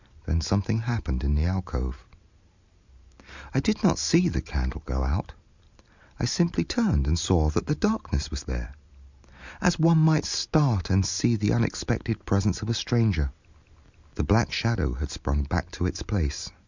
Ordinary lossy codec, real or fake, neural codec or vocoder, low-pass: Opus, 64 kbps; real; none; 7.2 kHz